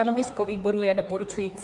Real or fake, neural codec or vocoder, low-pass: fake; codec, 24 kHz, 1 kbps, SNAC; 10.8 kHz